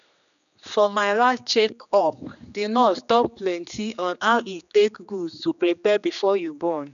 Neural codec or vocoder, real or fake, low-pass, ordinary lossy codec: codec, 16 kHz, 2 kbps, X-Codec, HuBERT features, trained on general audio; fake; 7.2 kHz; none